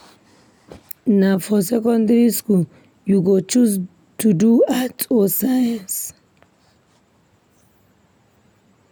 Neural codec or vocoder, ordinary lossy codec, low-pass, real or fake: none; none; none; real